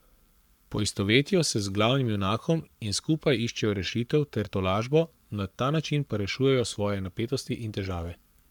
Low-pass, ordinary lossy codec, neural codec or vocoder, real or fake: 19.8 kHz; none; codec, 44.1 kHz, 7.8 kbps, Pupu-Codec; fake